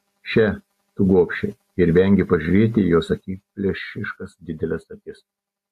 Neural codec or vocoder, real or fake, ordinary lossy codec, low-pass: none; real; AAC, 64 kbps; 14.4 kHz